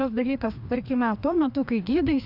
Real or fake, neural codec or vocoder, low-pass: fake; codec, 16 kHz, 2 kbps, FreqCodec, larger model; 5.4 kHz